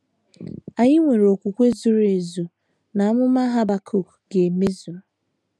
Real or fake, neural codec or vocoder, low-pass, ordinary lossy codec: real; none; none; none